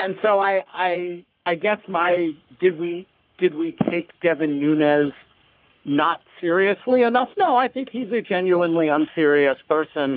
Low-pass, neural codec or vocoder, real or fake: 5.4 kHz; codec, 44.1 kHz, 3.4 kbps, Pupu-Codec; fake